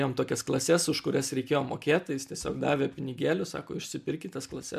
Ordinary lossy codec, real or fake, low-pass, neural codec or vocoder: MP3, 96 kbps; real; 14.4 kHz; none